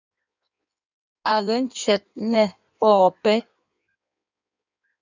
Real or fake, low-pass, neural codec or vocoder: fake; 7.2 kHz; codec, 16 kHz in and 24 kHz out, 1.1 kbps, FireRedTTS-2 codec